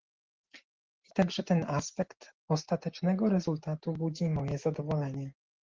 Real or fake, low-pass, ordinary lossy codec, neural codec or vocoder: real; 7.2 kHz; Opus, 16 kbps; none